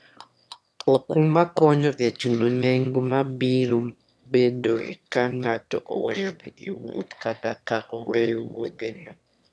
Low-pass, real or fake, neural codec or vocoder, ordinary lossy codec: none; fake; autoencoder, 22.05 kHz, a latent of 192 numbers a frame, VITS, trained on one speaker; none